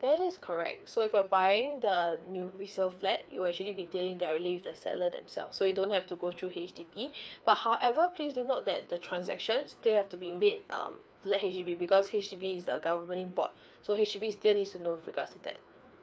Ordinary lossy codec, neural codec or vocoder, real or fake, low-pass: none; codec, 16 kHz, 2 kbps, FreqCodec, larger model; fake; none